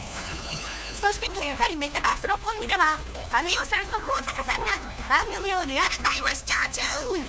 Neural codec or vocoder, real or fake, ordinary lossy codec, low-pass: codec, 16 kHz, 1 kbps, FunCodec, trained on LibriTTS, 50 frames a second; fake; none; none